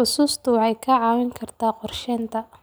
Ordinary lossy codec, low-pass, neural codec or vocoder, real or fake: none; none; none; real